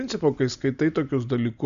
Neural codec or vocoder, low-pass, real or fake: none; 7.2 kHz; real